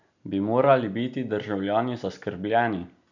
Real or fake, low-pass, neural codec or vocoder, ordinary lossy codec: real; 7.2 kHz; none; none